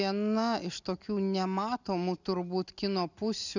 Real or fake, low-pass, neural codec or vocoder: real; 7.2 kHz; none